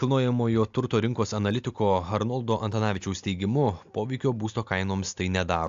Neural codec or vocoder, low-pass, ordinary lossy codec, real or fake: none; 7.2 kHz; AAC, 64 kbps; real